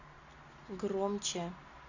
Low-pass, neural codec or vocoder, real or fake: 7.2 kHz; none; real